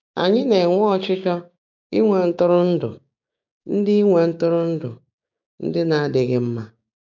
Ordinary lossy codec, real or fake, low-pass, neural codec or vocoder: MP3, 64 kbps; fake; 7.2 kHz; codec, 16 kHz, 6 kbps, DAC